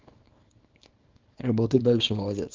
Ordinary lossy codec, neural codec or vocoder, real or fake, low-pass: Opus, 24 kbps; codec, 24 kHz, 0.9 kbps, WavTokenizer, small release; fake; 7.2 kHz